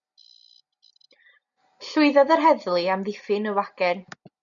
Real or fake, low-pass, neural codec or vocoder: real; 7.2 kHz; none